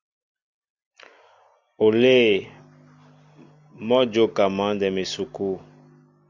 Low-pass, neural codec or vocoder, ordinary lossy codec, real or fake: 7.2 kHz; none; Opus, 64 kbps; real